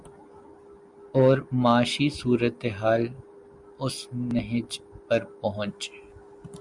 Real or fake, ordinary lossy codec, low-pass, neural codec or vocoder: real; Opus, 64 kbps; 10.8 kHz; none